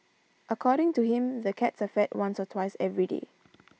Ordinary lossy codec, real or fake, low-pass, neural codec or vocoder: none; real; none; none